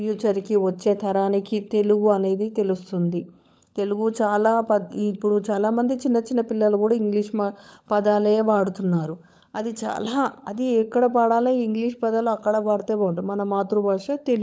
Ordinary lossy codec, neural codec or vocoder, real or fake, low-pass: none; codec, 16 kHz, 16 kbps, FunCodec, trained on LibriTTS, 50 frames a second; fake; none